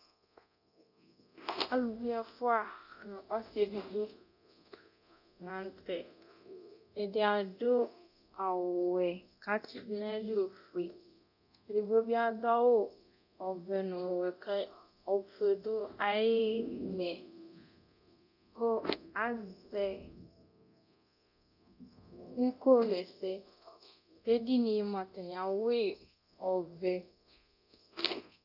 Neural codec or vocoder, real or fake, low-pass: codec, 24 kHz, 0.9 kbps, DualCodec; fake; 5.4 kHz